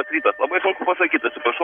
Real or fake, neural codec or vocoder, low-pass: real; none; 19.8 kHz